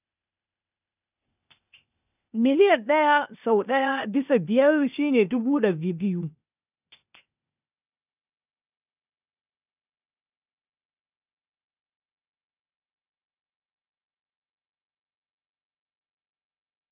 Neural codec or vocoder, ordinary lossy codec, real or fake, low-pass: codec, 16 kHz, 0.8 kbps, ZipCodec; none; fake; 3.6 kHz